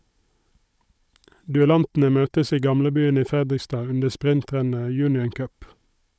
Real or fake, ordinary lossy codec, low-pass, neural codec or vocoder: fake; none; none; codec, 16 kHz, 16 kbps, FunCodec, trained on Chinese and English, 50 frames a second